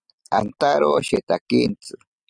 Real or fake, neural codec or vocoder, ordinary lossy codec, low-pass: real; none; Opus, 64 kbps; 9.9 kHz